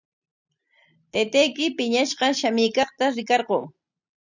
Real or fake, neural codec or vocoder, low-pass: real; none; 7.2 kHz